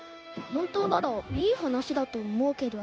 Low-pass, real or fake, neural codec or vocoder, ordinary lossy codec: none; fake; codec, 16 kHz, 0.9 kbps, LongCat-Audio-Codec; none